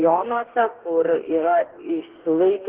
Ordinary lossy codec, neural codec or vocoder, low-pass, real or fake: Opus, 16 kbps; codec, 44.1 kHz, 2.6 kbps, DAC; 3.6 kHz; fake